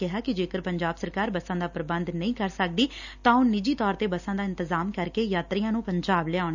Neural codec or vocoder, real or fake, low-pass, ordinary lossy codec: none; real; 7.2 kHz; none